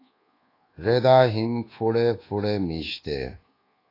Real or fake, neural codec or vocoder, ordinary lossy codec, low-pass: fake; codec, 24 kHz, 1.2 kbps, DualCodec; AAC, 24 kbps; 5.4 kHz